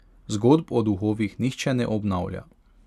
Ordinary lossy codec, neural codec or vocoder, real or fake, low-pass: none; none; real; 14.4 kHz